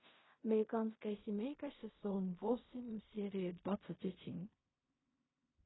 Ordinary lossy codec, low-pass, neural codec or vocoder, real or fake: AAC, 16 kbps; 7.2 kHz; codec, 16 kHz in and 24 kHz out, 0.4 kbps, LongCat-Audio-Codec, fine tuned four codebook decoder; fake